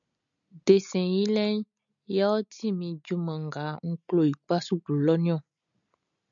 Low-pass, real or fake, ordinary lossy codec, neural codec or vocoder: 7.2 kHz; real; MP3, 96 kbps; none